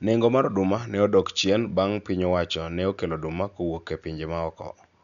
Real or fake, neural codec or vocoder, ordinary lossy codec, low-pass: real; none; none; 7.2 kHz